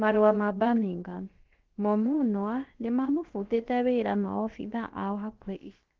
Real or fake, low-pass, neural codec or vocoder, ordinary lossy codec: fake; 7.2 kHz; codec, 16 kHz, about 1 kbps, DyCAST, with the encoder's durations; Opus, 16 kbps